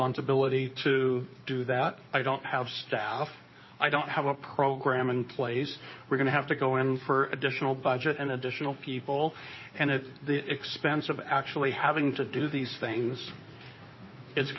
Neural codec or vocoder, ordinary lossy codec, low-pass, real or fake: codec, 16 kHz in and 24 kHz out, 2.2 kbps, FireRedTTS-2 codec; MP3, 24 kbps; 7.2 kHz; fake